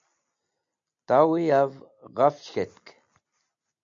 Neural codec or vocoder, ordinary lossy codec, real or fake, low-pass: none; AAC, 64 kbps; real; 7.2 kHz